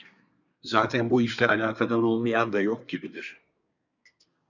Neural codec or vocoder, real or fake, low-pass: codec, 24 kHz, 1 kbps, SNAC; fake; 7.2 kHz